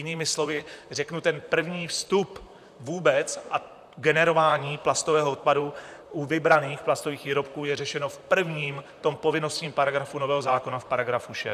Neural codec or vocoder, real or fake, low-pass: vocoder, 44.1 kHz, 128 mel bands, Pupu-Vocoder; fake; 14.4 kHz